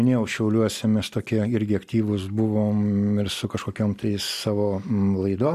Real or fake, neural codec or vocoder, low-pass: real; none; 14.4 kHz